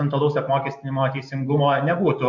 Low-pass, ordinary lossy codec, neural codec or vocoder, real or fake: 7.2 kHz; MP3, 48 kbps; none; real